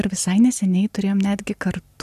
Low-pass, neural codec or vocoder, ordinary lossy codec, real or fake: 14.4 kHz; none; AAC, 96 kbps; real